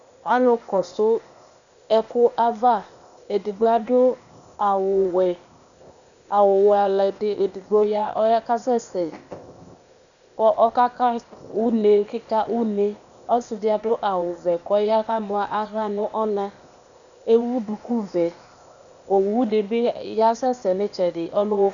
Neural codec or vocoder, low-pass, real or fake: codec, 16 kHz, 0.8 kbps, ZipCodec; 7.2 kHz; fake